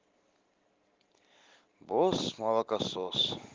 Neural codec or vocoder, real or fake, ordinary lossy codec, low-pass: none; real; Opus, 16 kbps; 7.2 kHz